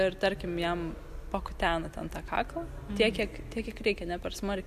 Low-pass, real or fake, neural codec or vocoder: 14.4 kHz; real; none